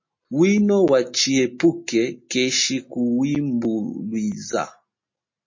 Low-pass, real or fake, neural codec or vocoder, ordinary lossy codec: 7.2 kHz; real; none; MP3, 32 kbps